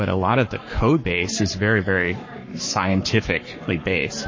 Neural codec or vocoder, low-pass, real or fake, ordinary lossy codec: codec, 44.1 kHz, 7.8 kbps, Pupu-Codec; 7.2 kHz; fake; MP3, 32 kbps